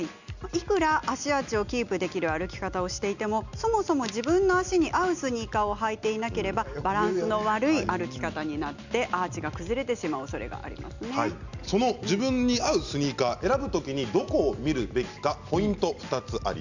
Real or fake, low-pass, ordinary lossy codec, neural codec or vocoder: real; 7.2 kHz; none; none